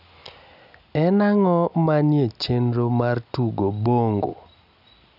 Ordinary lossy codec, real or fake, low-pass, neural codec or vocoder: none; real; 5.4 kHz; none